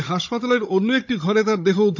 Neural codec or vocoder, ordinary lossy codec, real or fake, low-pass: codec, 16 kHz, 16 kbps, FunCodec, trained on Chinese and English, 50 frames a second; none; fake; 7.2 kHz